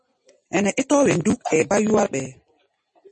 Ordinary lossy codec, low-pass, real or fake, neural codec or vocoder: MP3, 32 kbps; 9.9 kHz; real; none